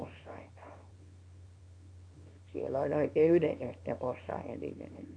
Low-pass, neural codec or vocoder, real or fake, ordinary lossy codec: 9.9 kHz; codec, 24 kHz, 0.9 kbps, WavTokenizer, small release; fake; none